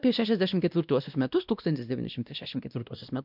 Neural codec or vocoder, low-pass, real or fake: codec, 16 kHz, 1 kbps, X-Codec, WavLM features, trained on Multilingual LibriSpeech; 5.4 kHz; fake